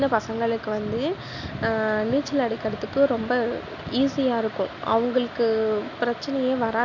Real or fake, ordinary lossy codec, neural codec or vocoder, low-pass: real; none; none; 7.2 kHz